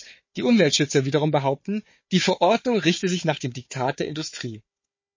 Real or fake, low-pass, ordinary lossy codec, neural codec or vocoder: fake; 7.2 kHz; MP3, 32 kbps; codec, 16 kHz, 4 kbps, FunCodec, trained on Chinese and English, 50 frames a second